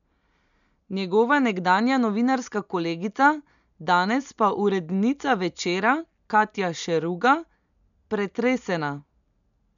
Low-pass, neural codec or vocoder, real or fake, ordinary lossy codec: 7.2 kHz; none; real; none